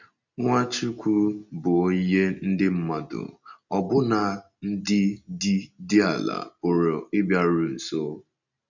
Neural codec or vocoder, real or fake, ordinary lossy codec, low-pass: none; real; none; 7.2 kHz